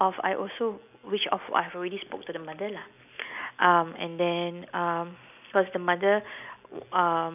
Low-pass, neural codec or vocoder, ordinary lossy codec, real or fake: 3.6 kHz; none; none; real